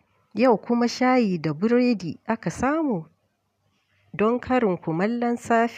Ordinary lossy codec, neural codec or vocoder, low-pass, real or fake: none; none; 14.4 kHz; real